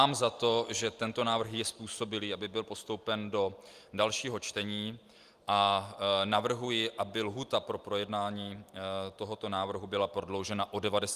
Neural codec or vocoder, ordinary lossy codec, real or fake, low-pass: none; Opus, 32 kbps; real; 14.4 kHz